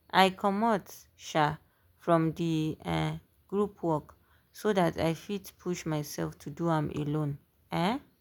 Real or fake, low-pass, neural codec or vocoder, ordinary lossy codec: real; none; none; none